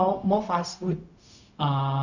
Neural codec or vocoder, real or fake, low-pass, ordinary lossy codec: codec, 16 kHz, 0.4 kbps, LongCat-Audio-Codec; fake; 7.2 kHz; none